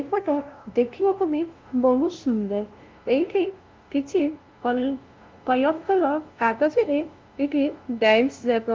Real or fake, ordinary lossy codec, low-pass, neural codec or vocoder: fake; Opus, 32 kbps; 7.2 kHz; codec, 16 kHz, 0.5 kbps, FunCodec, trained on LibriTTS, 25 frames a second